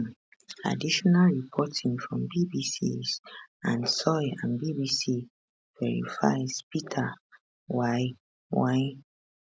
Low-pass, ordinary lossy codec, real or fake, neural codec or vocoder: none; none; real; none